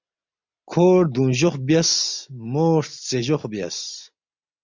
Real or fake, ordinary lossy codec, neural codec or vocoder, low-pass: real; MP3, 64 kbps; none; 7.2 kHz